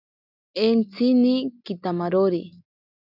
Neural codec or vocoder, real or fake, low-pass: none; real; 5.4 kHz